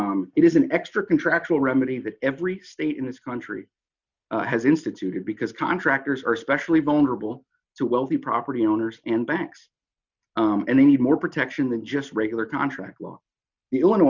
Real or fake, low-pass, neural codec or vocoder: real; 7.2 kHz; none